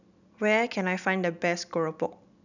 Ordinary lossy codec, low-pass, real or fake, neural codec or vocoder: none; 7.2 kHz; real; none